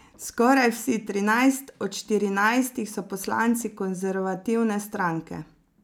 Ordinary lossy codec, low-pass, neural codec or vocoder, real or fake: none; none; none; real